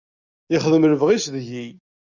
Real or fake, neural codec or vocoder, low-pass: real; none; 7.2 kHz